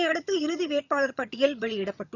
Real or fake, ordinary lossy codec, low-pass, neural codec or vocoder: fake; none; 7.2 kHz; vocoder, 22.05 kHz, 80 mel bands, HiFi-GAN